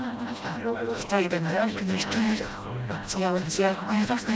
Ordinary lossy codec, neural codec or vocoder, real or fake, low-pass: none; codec, 16 kHz, 0.5 kbps, FreqCodec, smaller model; fake; none